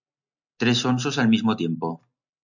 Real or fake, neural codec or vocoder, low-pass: real; none; 7.2 kHz